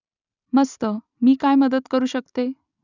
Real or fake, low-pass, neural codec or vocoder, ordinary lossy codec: real; 7.2 kHz; none; none